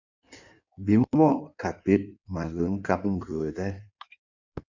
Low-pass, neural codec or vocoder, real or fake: 7.2 kHz; codec, 16 kHz in and 24 kHz out, 1.1 kbps, FireRedTTS-2 codec; fake